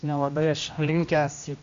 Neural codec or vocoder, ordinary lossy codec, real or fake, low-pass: codec, 16 kHz, 1 kbps, X-Codec, HuBERT features, trained on general audio; MP3, 48 kbps; fake; 7.2 kHz